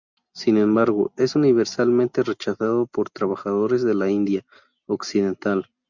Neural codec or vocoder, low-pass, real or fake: none; 7.2 kHz; real